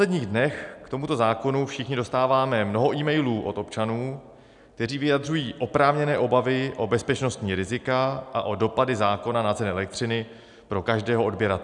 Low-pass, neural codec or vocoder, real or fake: 10.8 kHz; none; real